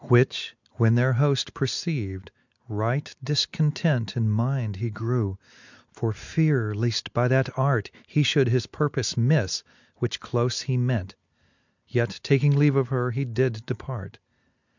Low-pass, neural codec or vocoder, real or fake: 7.2 kHz; none; real